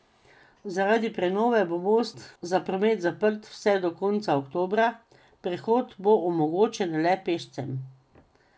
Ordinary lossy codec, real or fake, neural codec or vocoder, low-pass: none; real; none; none